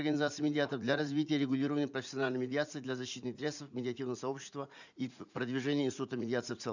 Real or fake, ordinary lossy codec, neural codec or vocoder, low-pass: fake; none; vocoder, 44.1 kHz, 80 mel bands, Vocos; 7.2 kHz